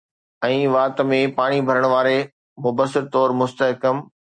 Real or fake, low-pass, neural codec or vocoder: real; 9.9 kHz; none